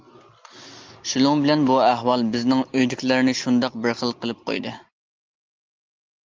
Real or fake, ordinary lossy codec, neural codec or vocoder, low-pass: real; Opus, 24 kbps; none; 7.2 kHz